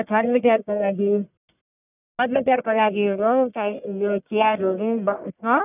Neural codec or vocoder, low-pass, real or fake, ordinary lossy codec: codec, 44.1 kHz, 1.7 kbps, Pupu-Codec; 3.6 kHz; fake; none